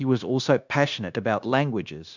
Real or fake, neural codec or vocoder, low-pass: fake; codec, 16 kHz, 0.9 kbps, LongCat-Audio-Codec; 7.2 kHz